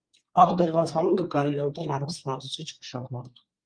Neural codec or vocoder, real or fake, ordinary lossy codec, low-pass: codec, 24 kHz, 1 kbps, SNAC; fake; Opus, 32 kbps; 9.9 kHz